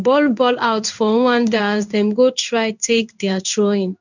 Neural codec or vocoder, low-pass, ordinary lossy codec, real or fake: codec, 16 kHz in and 24 kHz out, 1 kbps, XY-Tokenizer; 7.2 kHz; none; fake